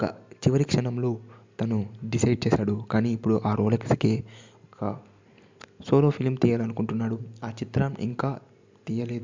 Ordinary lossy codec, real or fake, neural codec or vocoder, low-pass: MP3, 64 kbps; real; none; 7.2 kHz